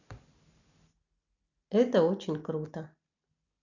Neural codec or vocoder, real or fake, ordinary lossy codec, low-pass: none; real; none; 7.2 kHz